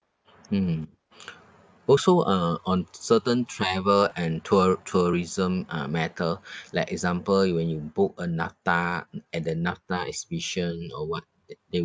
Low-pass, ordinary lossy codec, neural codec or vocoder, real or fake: none; none; none; real